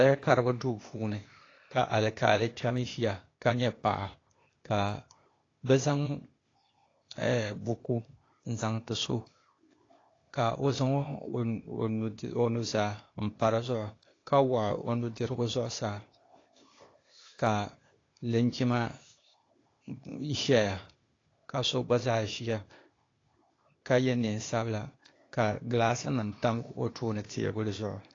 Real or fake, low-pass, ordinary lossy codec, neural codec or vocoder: fake; 7.2 kHz; AAC, 32 kbps; codec, 16 kHz, 0.8 kbps, ZipCodec